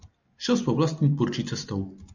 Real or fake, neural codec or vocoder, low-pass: real; none; 7.2 kHz